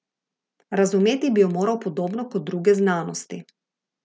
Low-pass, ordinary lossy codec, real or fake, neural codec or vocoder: none; none; real; none